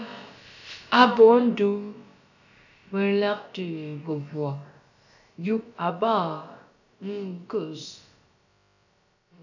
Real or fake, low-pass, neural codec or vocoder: fake; 7.2 kHz; codec, 16 kHz, about 1 kbps, DyCAST, with the encoder's durations